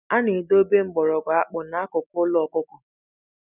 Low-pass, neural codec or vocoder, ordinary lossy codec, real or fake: 3.6 kHz; none; none; real